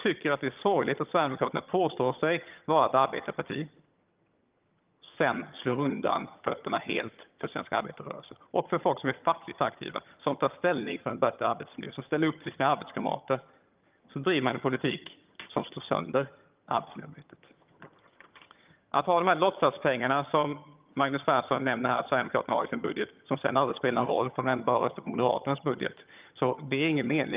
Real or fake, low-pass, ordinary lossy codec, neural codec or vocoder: fake; 3.6 kHz; Opus, 24 kbps; vocoder, 22.05 kHz, 80 mel bands, HiFi-GAN